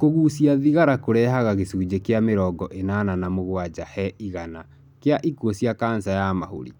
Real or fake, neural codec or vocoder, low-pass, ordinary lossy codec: real; none; 19.8 kHz; none